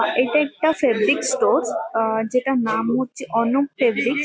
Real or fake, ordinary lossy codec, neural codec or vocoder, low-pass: real; none; none; none